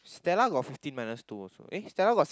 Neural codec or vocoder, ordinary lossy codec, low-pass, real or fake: none; none; none; real